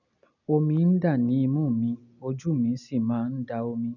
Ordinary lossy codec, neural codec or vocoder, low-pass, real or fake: none; none; 7.2 kHz; real